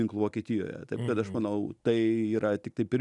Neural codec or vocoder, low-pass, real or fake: none; 10.8 kHz; real